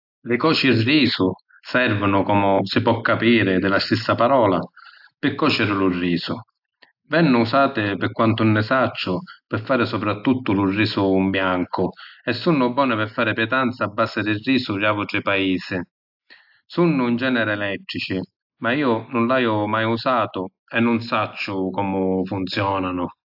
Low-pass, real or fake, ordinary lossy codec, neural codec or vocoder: 5.4 kHz; real; AAC, 48 kbps; none